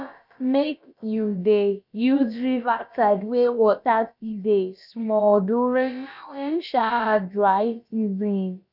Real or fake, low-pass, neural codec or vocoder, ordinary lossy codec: fake; 5.4 kHz; codec, 16 kHz, about 1 kbps, DyCAST, with the encoder's durations; none